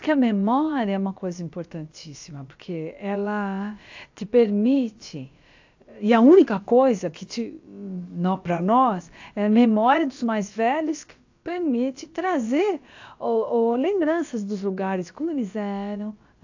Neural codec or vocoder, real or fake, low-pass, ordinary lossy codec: codec, 16 kHz, about 1 kbps, DyCAST, with the encoder's durations; fake; 7.2 kHz; AAC, 48 kbps